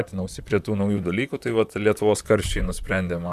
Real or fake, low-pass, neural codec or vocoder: fake; 14.4 kHz; vocoder, 44.1 kHz, 128 mel bands, Pupu-Vocoder